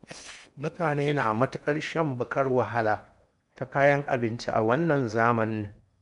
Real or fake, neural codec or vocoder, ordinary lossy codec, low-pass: fake; codec, 16 kHz in and 24 kHz out, 0.8 kbps, FocalCodec, streaming, 65536 codes; none; 10.8 kHz